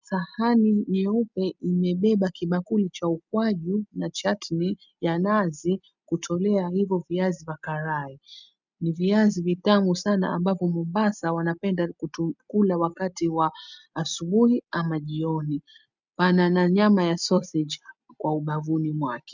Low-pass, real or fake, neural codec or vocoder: 7.2 kHz; real; none